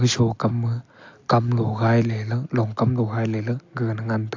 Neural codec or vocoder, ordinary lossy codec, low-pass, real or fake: none; AAC, 48 kbps; 7.2 kHz; real